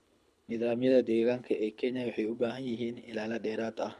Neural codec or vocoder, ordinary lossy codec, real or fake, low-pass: codec, 24 kHz, 6 kbps, HILCodec; none; fake; none